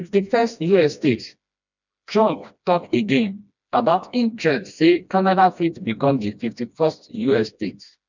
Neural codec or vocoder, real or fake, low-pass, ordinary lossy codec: codec, 16 kHz, 1 kbps, FreqCodec, smaller model; fake; 7.2 kHz; none